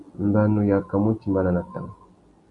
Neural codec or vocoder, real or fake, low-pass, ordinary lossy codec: none; real; 10.8 kHz; AAC, 64 kbps